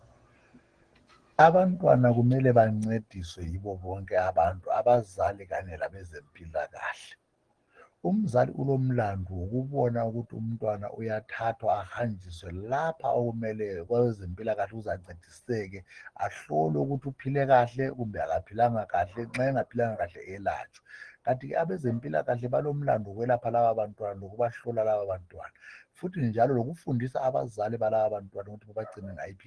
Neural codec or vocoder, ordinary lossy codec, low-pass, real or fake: none; Opus, 16 kbps; 9.9 kHz; real